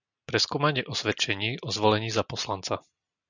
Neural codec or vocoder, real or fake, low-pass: none; real; 7.2 kHz